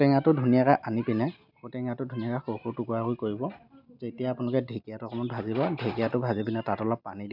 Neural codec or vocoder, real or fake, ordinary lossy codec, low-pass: none; real; none; 5.4 kHz